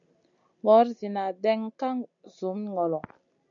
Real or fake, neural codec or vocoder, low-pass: real; none; 7.2 kHz